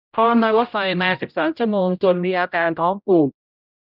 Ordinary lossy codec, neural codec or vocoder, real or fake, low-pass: none; codec, 16 kHz, 0.5 kbps, X-Codec, HuBERT features, trained on general audio; fake; 5.4 kHz